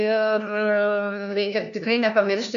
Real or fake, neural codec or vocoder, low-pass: fake; codec, 16 kHz, 1 kbps, FunCodec, trained on LibriTTS, 50 frames a second; 7.2 kHz